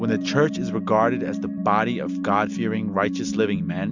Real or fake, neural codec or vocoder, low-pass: real; none; 7.2 kHz